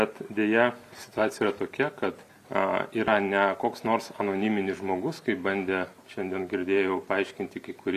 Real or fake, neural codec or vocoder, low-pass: real; none; 14.4 kHz